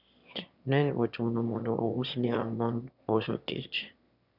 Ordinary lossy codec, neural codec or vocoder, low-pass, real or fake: none; autoencoder, 22.05 kHz, a latent of 192 numbers a frame, VITS, trained on one speaker; 5.4 kHz; fake